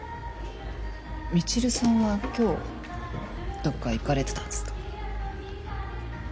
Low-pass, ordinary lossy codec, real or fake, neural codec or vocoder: none; none; real; none